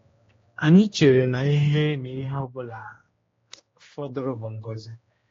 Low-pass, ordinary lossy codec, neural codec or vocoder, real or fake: 7.2 kHz; AAC, 32 kbps; codec, 16 kHz, 1 kbps, X-Codec, HuBERT features, trained on general audio; fake